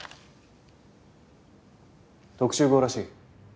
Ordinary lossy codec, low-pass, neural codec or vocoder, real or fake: none; none; none; real